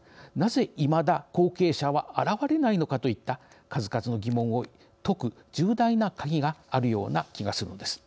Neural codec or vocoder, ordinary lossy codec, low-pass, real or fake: none; none; none; real